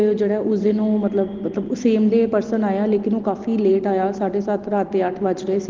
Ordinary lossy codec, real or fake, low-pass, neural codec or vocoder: Opus, 16 kbps; real; 7.2 kHz; none